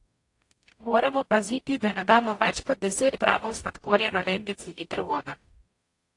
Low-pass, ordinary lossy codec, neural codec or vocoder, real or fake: 10.8 kHz; AAC, 48 kbps; codec, 44.1 kHz, 0.9 kbps, DAC; fake